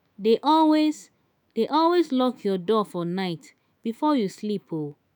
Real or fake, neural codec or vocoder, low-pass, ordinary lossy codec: fake; autoencoder, 48 kHz, 128 numbers a frame, DAC-VAE, trained on Japanese speech; none; none